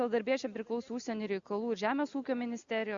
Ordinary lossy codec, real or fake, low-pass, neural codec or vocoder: Opus, 64 kbps; real; 7.2 kHz; none